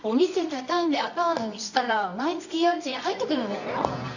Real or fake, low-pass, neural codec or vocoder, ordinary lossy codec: fake; 7.2 kHz; codec, 24 kHz, 0.9 kbps, WavTokenizer, medium music audio release; none